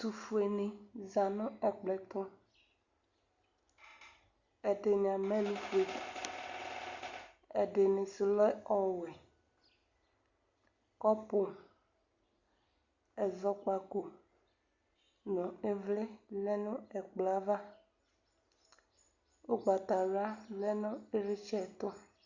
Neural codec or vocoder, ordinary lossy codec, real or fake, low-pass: none; Opus, 64 kbps; real; 7.2 kHz